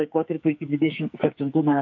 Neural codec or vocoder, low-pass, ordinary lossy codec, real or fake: codec, 44.1 kHz, 2.6 kbps, SNAC; 7.2 kHz; AAC, 48 kbps; fake